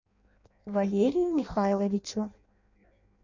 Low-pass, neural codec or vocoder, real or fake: 7.2 kHz; codec, 16 kHz in and 24 kHz out, 0.6 kbps, FireRedTTS-2 codec; fake